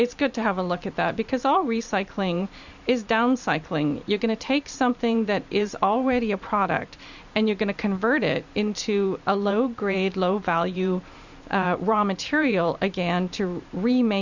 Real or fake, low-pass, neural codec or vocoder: fake; 7.2 kHz; vocoder, 44.1 kHz, 128 mel bands every 512 samples, BigVGAN v2